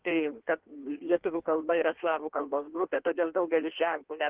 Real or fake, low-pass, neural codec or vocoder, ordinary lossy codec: fake; 3.6 kHz; codec, 16 kHz in and 24 kHz out, 1.1 kbps, FireRedTTS-2 codec; Opus, 16 kbps